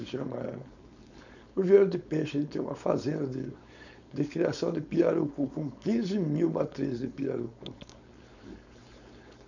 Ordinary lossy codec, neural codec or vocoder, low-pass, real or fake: none; codec, 16 kHz, 4.8 kbps, FACodec; 7.2 kHz; fake